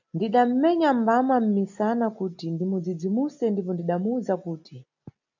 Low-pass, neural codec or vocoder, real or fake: 7.2 kHz; none; real